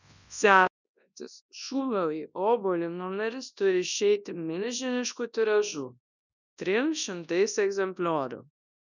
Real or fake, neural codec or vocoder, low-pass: fake; codec, 24 kHz, 0.9 kbps, WavTokenizer, large speech release; 7.2 kHz